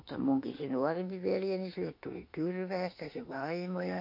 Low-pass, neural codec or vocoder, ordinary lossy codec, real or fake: 5.4 kHz; autoencoder, 48 kHz, 32 numbers a frame, DAC-VAE, trained on Japanese speech; MP3, 32 kbps; fake